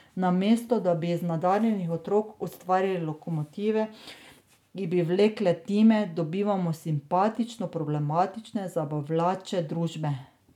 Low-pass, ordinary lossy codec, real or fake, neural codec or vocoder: 19.8 kHz; none; real; none